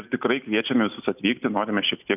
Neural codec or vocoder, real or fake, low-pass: none; real; 3.6 kHz